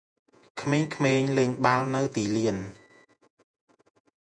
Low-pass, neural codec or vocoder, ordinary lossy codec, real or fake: 9.9 kHz; vocoder, 48 kHz, 128 mel bands, Vocos; MP3, 96 kbps; fake